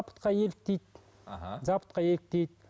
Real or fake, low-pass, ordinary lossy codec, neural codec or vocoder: real; none; none; none